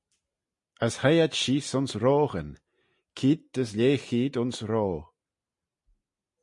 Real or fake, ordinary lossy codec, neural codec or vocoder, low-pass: real; MP3, 48 kbps; none; 10.8 kHz